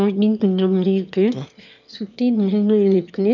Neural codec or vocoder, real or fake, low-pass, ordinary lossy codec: autoencoder, 22.05 kHz, a latent of 192 numbers a frame, VITS, trained on one speaker; fake; 7.2 kHz; none